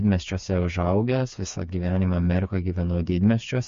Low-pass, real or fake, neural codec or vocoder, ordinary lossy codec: 7.2 kHz; fake; codec, 16 kHz, 4 kbps, FreqCodec, smaller model; MP3, 48 kbps